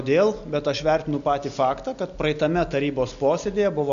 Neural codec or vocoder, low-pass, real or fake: none; 7.2 kHz; real